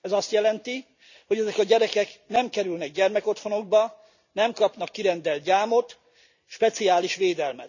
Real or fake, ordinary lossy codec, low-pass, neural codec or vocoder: real; none; 7.2 kHz; none